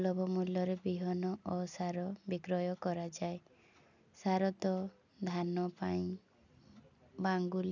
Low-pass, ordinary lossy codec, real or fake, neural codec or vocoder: 7.2 kHz; none; real; none